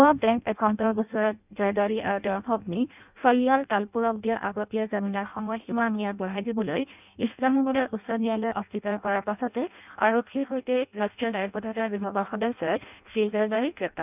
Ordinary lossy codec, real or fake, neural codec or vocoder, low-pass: none; fake; codec, 16 kHz in and 24 kHz out, 0.6 kbps, FireRedTTS-2 codec; 3.6 kHz